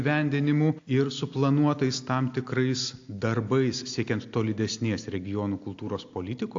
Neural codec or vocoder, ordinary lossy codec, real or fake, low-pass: none; AAC, 48 kbps; real; 7.2 kHz